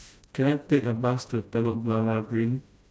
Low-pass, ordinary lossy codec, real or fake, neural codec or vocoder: none; none; fake; codec, 16 kHz, 1 kbps, FreqCodec, smaller model